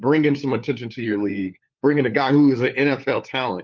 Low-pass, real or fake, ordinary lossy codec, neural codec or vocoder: 7.2 kHz; fake; Opus, 32 kbps; codec, 16 kHz, 4 kbps, FunCodec, trained on LibriTTS, 50 frames a second